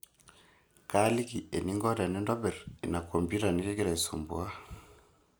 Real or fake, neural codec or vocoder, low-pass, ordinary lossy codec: real; none; none; none